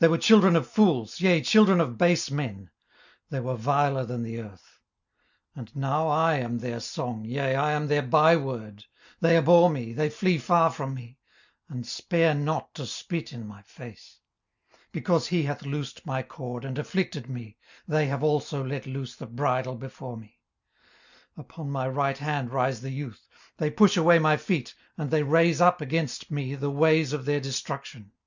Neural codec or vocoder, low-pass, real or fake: none; 7.2 kHz; real